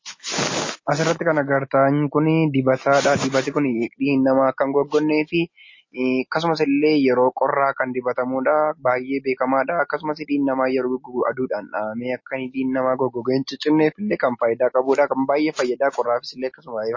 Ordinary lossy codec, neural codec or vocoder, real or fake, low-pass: MP3, 32 kbps; none; real; 7.2 kHz